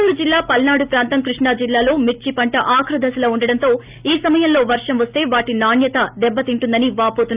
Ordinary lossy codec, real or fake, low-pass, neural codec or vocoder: Opus, 24 kbps; real; 3.6 kHz; none